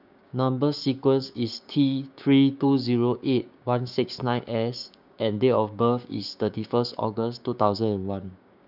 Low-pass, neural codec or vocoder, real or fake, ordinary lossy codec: 5.4 kHz; codec, 16 kHz, 6 kbps, DAC; fake; none